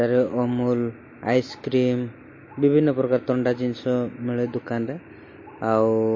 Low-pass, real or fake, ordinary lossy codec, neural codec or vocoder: 7.2 kHz; real; MP3, 32 kbps; none